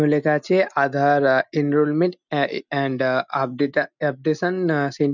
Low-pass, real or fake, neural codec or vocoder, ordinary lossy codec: 7.2 kHz; real; none; none